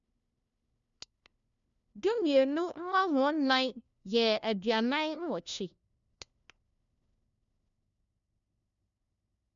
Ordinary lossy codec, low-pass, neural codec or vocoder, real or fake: none; 7.2 kHz; codec, 16 kHz, 1 kbps, FunCodec, trained on LibriTTS, 50 frames a second; fake